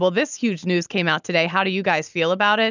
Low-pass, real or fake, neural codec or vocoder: 7.2 kHz; real; none